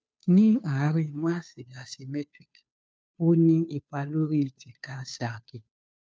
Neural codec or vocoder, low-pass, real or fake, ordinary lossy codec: codec, 16 kHz, 2 kbps, FunCodec, trained on Chinese and English, 25 frames a second; none; fake; none